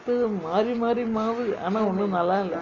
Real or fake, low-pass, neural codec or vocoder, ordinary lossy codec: real; 7.2 kHz; none; none